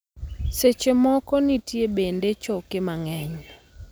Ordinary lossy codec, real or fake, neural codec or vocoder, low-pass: none; real; none; none